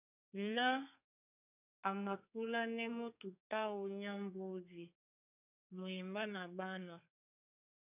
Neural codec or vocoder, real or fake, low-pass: codec, 32 kHz, 1.9 kbps, SNAC; fake; 3.6 kHz